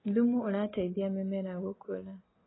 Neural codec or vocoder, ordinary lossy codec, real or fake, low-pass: none; AAC, 16 kbps; real; 7.2 kHz